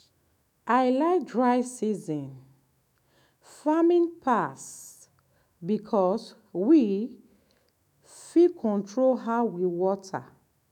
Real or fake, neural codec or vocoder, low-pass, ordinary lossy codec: fake; autoencoder, 48 kHz, 128 numbers a frame, DAC-VAE, trained on Japanese speech; 19.8 kHz; none